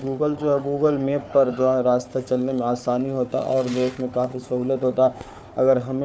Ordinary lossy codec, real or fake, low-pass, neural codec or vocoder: none; fake; none; codec, 16 kHz, 4 kbps, FunCodec, trained on Chinese and English, 50 frames a second